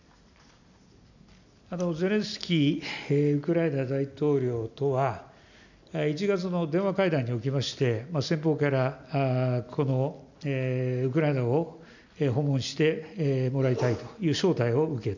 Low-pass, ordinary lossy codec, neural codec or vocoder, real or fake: 7.2 kHz; none; none; real